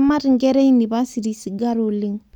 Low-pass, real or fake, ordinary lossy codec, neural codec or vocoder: 19.8 kHz; real; none; none